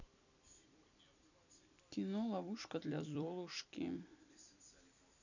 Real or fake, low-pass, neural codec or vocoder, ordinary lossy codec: fake; 7.2 kHz; vocoder, 44.1 kHz, 128 mel bands every 256 samples, BigVGAN v2; none